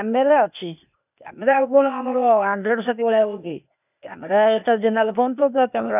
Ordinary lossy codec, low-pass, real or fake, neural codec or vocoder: none; 3.6 kHz; fake; codec, 16 kHz, 0.8 kbps, ZipCodec